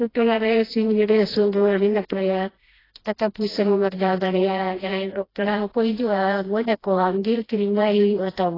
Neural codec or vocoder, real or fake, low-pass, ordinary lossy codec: codec, 16 kHz, 1 kbps, FreqCodec, smaller model; fake; 5.4 kHz; AAC, 24 kbps